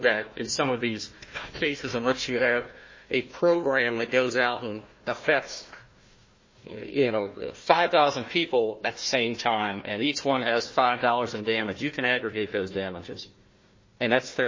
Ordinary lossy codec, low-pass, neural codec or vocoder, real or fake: MP3, 32 kbps; 7.2 kHz; codec, 16 kHz, 1 kbps, FunCodec, trained on Chinese and English, 50 frames a second; fake